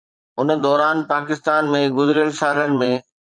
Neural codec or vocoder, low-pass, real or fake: vocoder, 22.05 kHz, 80 mel bands, Vocos; 9.9 kHz; fake